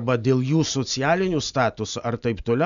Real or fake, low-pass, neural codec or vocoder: real; 7.2 kHz; none